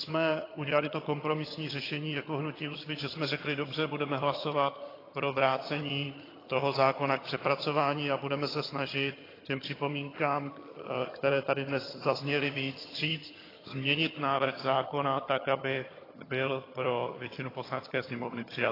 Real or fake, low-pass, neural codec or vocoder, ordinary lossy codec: fake; 5.4 kHz; vocoder, 22.05 kHz, 80 mel bands, HiFi-GAN; AAC, 24 kbps